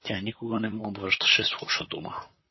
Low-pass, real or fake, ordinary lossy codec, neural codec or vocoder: 7.2 kHz; fake; MP3, 24 kbps; vocoder, 44.1 kHz, 128 mel bands, Pupu-Vocoder